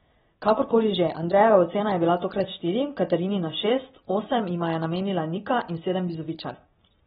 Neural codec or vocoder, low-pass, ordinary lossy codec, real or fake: none; 19.8 kHz; AAC, 16 kbps; real